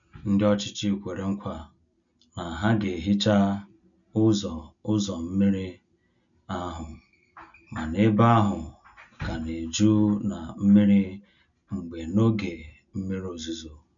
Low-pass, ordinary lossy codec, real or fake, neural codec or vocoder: 7.2 kHz; none; real; none